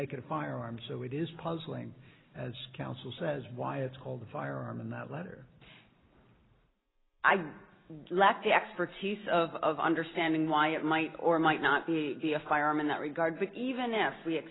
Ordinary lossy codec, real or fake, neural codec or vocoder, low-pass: AAC, 16 kbps; real; none; 7.2 kHz